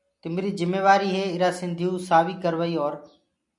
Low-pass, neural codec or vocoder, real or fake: 10.8 kHz; none; real